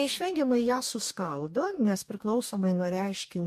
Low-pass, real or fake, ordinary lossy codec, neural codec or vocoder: 14.4 kHz; fake; MP3, 64 kbps; codec, 44.1 kHz, 2.6 kbps, DAC